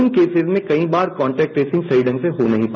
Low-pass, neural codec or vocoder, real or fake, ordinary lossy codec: 7.2 kHz; none; real; none